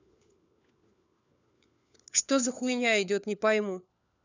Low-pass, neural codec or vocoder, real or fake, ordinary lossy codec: 7.2 kHz; codec, 16 kHz, 4 kbps, FunCodec, trained on LibriTTS, 50 frames a second; fake; none